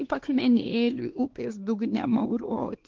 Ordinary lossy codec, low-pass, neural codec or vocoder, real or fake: Opus, 16 kbps; 7.2 kHz; codec, 16 kHz, 2 kbps, X-Codec, WavLM features, trained on Multilingual LibriSpeech; fake